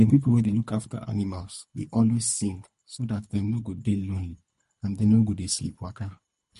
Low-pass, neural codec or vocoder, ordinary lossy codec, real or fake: 10.8 kHz; codec, 24 kHz, 3 kbps, HILCodec; MP3, 48 kbps; fake